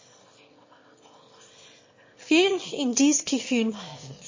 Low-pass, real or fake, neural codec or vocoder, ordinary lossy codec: 7.2 kHz; fake; autoencoder, 22.05 kHz, a latent of 192 numbers a frame, VITS, trained on one speaker; MP3, 32 kbps